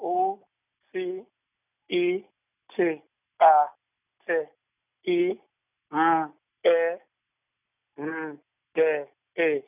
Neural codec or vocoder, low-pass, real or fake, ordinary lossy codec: none; 3.6 kHz; real; none